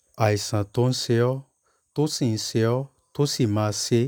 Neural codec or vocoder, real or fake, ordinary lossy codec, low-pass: none; real; none; none